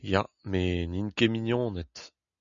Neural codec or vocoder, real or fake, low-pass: none; real; 7.2 kHz